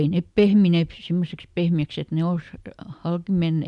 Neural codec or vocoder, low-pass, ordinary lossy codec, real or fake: none; 10.8 kHz; none; real